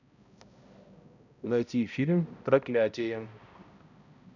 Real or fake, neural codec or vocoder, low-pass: fake; codec, 16 kHz, 0.5 kbps, X-Codec, HuBERT features, trained on balanced general audio; 7.2 kHz